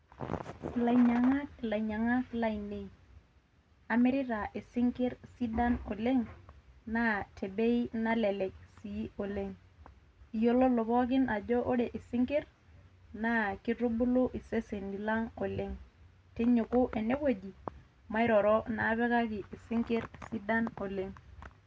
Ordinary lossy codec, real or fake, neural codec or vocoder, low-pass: none; real; none; none